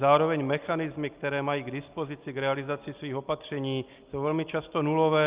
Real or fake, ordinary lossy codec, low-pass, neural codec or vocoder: real; Opus, 24 kbps; 3.6 kHz; none